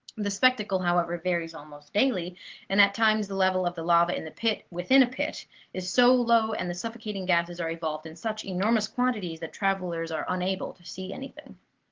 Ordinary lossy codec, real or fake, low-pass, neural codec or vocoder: Opus, 16 kbps; real; 7.2 kHz; none